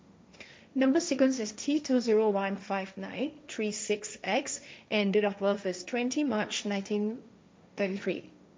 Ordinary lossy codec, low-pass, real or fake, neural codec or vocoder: none; none; fake; codec, 16 kHz, 1.1 kbps, Voila-Tokenizer